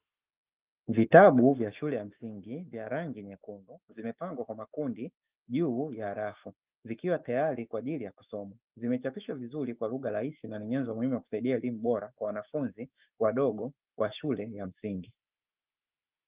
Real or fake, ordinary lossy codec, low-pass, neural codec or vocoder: fake; Opus, 32 kbps; 3.6 kHz; autoencoder, 48 kHz, 128 numbers a frame, DAC-VAE, trained on Japanese speech